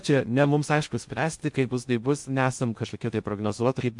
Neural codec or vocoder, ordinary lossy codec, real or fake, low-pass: codec, 16 kHz in and 24 kHz out, 0.6 kbps, FocalCodec, streaming, 2048 codes; MP3, 64 kbps; fake; 10.8 kHz